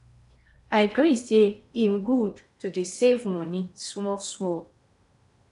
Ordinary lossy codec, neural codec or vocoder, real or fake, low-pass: none; codec, 16 kHz in and 24 kHz out, 0.8 kbps, FocalCodec, streaming, 65536 codes; fake; 10.8 kHz